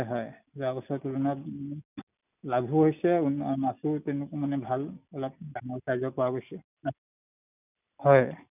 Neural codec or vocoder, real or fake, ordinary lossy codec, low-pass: none; real; none; 3.6 kHz